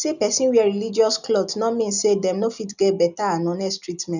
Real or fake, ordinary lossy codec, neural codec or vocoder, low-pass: real; none; none; 7.2 kHz